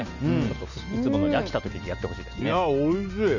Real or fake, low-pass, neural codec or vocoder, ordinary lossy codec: real; 7.2 kHz; none; none